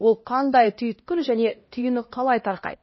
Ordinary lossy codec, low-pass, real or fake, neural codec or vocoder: MP3, 24 kbps; 7.2 kHz; fake; codec, 16 kHz, 1 kbps, X-Codec, HuBERT features, trained on LibriSpeech